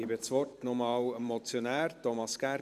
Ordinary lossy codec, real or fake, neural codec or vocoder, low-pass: none; fake; vocoder, 44.1 kHz, 128 mel bands every 512 samples, BigVGAN v2; 14.4 kHz